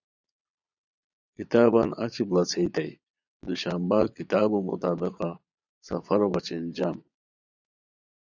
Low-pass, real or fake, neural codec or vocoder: 7.2 kHz; fake; vocoder, 22.05 kHz, 80 mel bands, Vocos